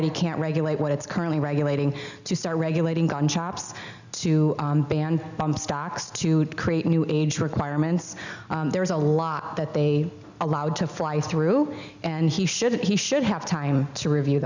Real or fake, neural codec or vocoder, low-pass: real; none; 7.2 kHz